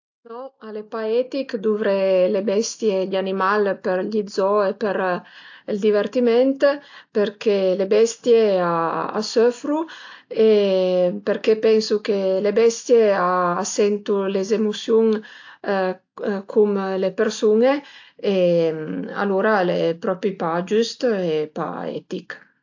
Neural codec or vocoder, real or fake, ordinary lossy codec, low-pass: none; real; AAC, 48 kbps; 7.2 kHz